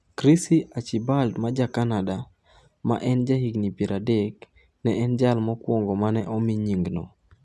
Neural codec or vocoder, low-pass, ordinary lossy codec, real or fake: none; none; none; real